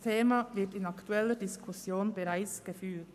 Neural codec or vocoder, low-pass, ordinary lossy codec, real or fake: codec, 44.1 kHz, 7.8 kbps, Pupu-Codec; 14.4 kHz; none; fake